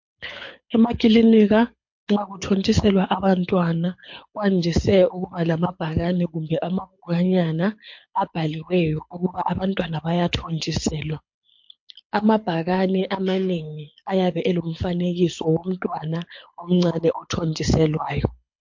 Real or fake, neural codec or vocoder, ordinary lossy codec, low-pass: fake; codec, 24 kHz, 6 kbps, HILCodec; MP3, 48 kbps; 7.2 kHz